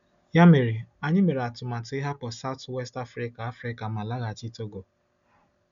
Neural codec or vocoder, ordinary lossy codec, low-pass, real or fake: none; none; 7.2 kHz; real